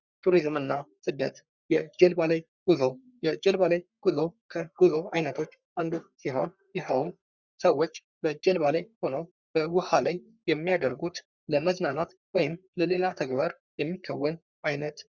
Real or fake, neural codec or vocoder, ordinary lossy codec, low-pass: fake; codec, 44.1 kHz, 3.4 kbps, Pupu-Codec; Opus, 64 kbps; 7.2 kHz